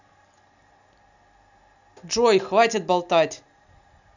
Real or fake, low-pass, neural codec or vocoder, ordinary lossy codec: real; 7.2 kHz; none; none